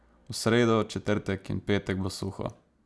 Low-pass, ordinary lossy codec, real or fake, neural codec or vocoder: none; none; real; none